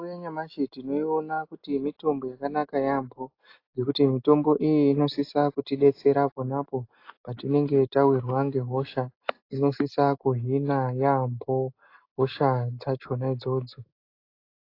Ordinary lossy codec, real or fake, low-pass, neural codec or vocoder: AAC, 32 kbps; real; 5.4 kHz; none